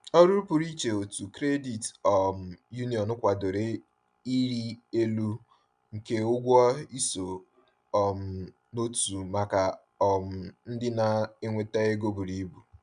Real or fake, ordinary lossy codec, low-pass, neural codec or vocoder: real; none; 9.9 kHz; none